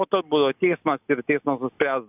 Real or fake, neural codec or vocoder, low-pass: real; none; 3.6 kHz